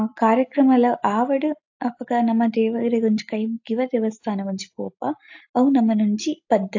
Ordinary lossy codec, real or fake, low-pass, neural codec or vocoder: AAC, 48 kbps; real; 7.2 kHz; none